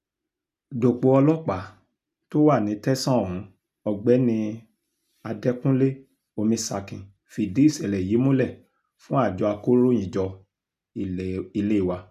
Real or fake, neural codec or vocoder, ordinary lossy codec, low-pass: real; none; none; 14.4 kHz